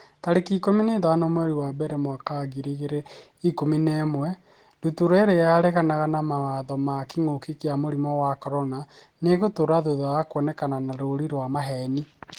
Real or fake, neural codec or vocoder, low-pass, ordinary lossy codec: real; none; 19.8 kHz; Opus, 16 kbps